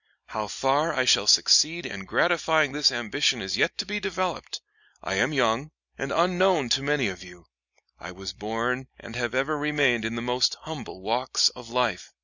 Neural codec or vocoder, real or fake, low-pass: none; real; 7.2 kHz